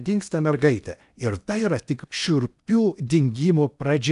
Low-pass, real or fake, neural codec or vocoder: 10.8 kHz; fake; codec, 16 kHz in and 24 kHz out, 0.8 kbps, FocalCodec, streaming, 65536 codes